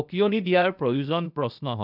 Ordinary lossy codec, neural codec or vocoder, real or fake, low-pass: none; codec, 16 kHz, 0.8 kbps, ZipCodec; fake; 5.4 kHz